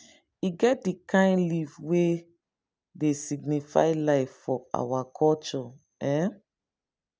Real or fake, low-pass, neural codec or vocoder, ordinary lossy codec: real; none; none; none